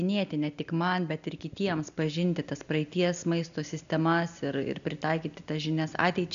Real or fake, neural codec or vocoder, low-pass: real; none; 7.2 kHz